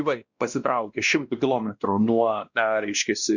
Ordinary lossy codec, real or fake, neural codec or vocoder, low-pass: Opus, 64 kbps; fake; codec, 16 kHz, 1 kbps, X-Codec, WavLM features, trained on Multilingual LibriSpeech; 7.2 kHz